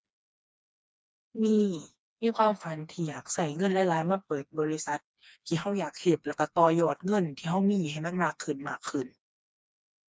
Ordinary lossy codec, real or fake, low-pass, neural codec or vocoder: none; fake; none; codec, 16 kHz, 2 kbps, FreqCodec, smaller model